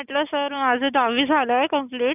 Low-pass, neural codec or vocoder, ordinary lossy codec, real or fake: 3.6 kHz; none; none; real